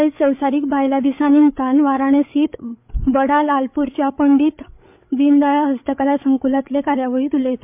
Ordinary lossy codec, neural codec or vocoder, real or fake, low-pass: MP3, 32 kbps; codec, 16 kHz, 8 kbps, FreqCodec, larger model; fake; 3.6 kHz